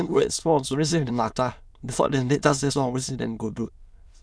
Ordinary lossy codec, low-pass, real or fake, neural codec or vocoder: none; none; fake; autoencoder, 22.05 kHz, a latent of 192 numbers a frame, VITS, trained on many speakers